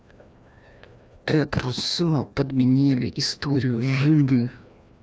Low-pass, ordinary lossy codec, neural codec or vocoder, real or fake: none; none; codec, 16 kHz, 1 kbps, FreqCodec, larger model; fake